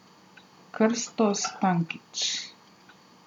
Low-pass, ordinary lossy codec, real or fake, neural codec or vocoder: 19.8 kHz; none; real; none